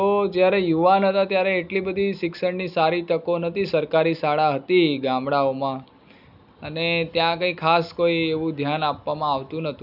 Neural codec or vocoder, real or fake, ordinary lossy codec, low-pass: none; real; none; 5.4 kHz